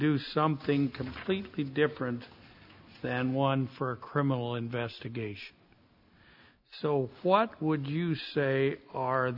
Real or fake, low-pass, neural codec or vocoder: real; 5.4 kHz; none